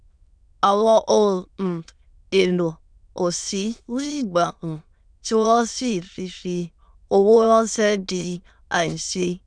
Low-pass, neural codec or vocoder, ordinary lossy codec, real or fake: 9.9 kHz; autoencoder, 22.05 kHz, a latent of 192 numbers a frame, VITS, trained on many speakers; none; fake